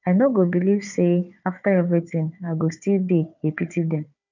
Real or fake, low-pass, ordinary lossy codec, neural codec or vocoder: fake; 7.2 kHz; none; codec, 16 kHz, 4 kbps, FunCodec, trained on Chinese and English, 50 frames a second